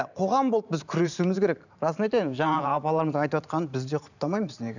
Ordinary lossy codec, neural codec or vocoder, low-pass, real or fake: none; vocoder, 44.1 kHz, 128 mel bands every 512 samples, BigVGAN v2; 7.2 kHz; fake